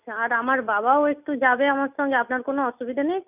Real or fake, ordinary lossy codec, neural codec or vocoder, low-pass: real; none; none; 3.6 kHz